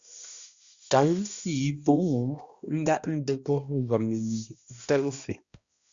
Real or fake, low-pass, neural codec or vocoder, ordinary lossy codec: fake; 7.2 kHz; codec, 16 kHz, 1 kbps, X-Codec, HuBERT features, trained on balanced general audio; Opus, 64 kbps